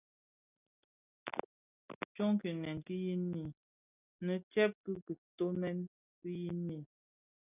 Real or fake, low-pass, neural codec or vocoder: real; 3.6 kHz; none